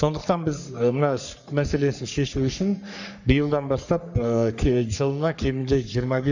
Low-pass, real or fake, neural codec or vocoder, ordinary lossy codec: 7.2 kHz; fake; codec, 44.1 kHz, 3.4 kbps, Pupu-Codec; none